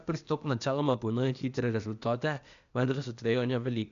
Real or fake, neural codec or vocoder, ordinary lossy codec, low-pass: fake; codec, 16 kHz, 0.8 kbps, ZipCodec; none; 7.2 kHz